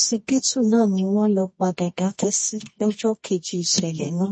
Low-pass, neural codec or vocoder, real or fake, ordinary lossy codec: 9.9 kHz; codec, 24 kHz, 0.9 kbps, WavTokenizer, medium music audio release; fake; MP3, 32 kbps